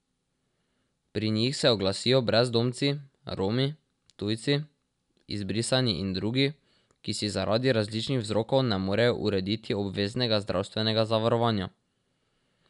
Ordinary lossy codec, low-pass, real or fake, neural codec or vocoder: none; 10.8 kHz; real; none